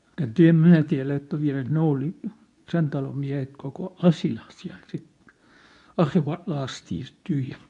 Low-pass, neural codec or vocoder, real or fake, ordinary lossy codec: 10.8 kHz; codec, 24 kHz, 0.9 kbps, WavTokenizer, medium speech release version 2; fake; none